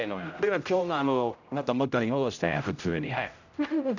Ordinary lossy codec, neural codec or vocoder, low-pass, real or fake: none; codec, 16 kHz, 0.5 kbps, X-Codec, HuBERT features, trained on general audio; 7.2 kHz; fake